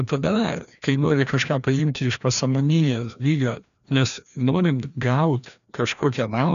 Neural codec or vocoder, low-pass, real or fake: codec, 16 kHz, 1 kbps, FreqCodec, larger model; 7.2 kHz; fake